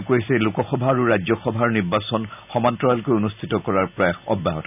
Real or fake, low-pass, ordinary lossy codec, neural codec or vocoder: real; 3.6 kHz; none; none